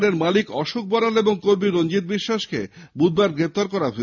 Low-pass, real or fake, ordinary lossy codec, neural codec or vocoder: 7.2 kHz; real; none; none